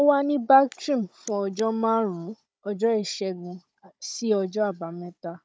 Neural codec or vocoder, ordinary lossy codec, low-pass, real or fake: codec, 16 kHz, 16 kbps, FunCodec, trained on Chinese and English, 50 frames a second; none; none; fake